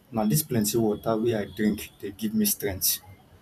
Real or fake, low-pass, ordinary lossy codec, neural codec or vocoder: fake; 14.4 kHz; none; vocoder, 44.1 kHz, 128 mel bands every 512 samples, BigVGAN v2